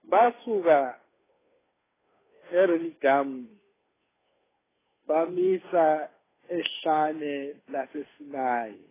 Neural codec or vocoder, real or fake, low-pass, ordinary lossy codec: codec, 16 kHz, 2 kbps, FunCodec, trained on Chinese and English, 25 frames a second; fake; 3.6 kHz; AAC, 16 kbps